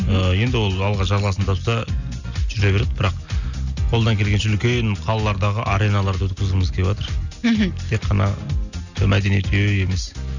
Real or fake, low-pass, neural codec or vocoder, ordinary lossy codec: real; 7.2 kHz; none; none